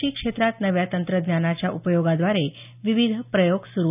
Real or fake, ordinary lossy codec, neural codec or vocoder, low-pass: real; none; none; 3.6 kHz